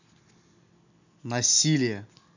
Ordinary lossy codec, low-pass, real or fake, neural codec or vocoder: none; 7.2 kHz; real; none